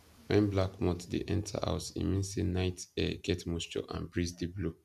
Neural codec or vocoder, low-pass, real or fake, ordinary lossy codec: none; 14.4 kHz; real; none